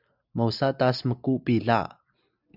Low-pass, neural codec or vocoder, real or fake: 5.4 kHz; none; real